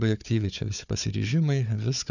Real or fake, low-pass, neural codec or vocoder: fake; 7.2 kHz; codec, 16 kHz, 4 kbps, FunCodec, trained on Chinese and English, 50 frames a second